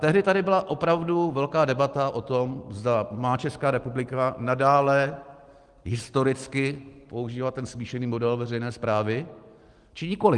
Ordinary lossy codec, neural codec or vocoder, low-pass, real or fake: Opus, 24 kbps; none; 10.8 kHz; real